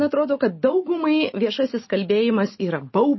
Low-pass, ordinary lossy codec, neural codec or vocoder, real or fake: 7.2 kHz; MP3, 24 kbps; none; real